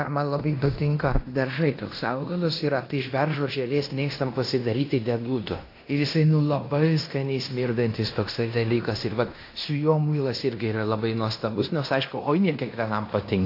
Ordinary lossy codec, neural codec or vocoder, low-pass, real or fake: AAC, 32 kbps; codec, 16 kHz in and 24 kHz out, 0.9 kbps, LongCat-Audio-Codec, fine tuned four codebook decoder; 5.4 kHz; fake